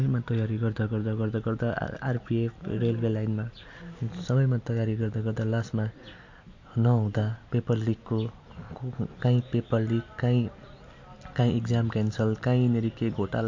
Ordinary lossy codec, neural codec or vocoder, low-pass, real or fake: MP3, 48 kbps; none; 7.2 kHz; real